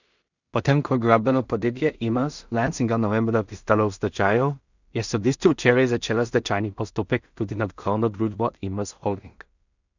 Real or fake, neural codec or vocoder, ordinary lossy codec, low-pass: fake; codec, 16 kHz in and 24 kHz out, 0.4 kbps, LongCat-Audio-Codec, two codebook decoder; none; 7.2 kHz